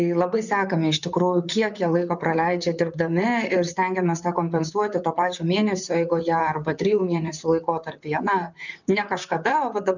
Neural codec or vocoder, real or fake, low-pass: vocoder, 44.1 kHz, 128 mel bands, Pupu-Vocoder; fake; 7.2 kHz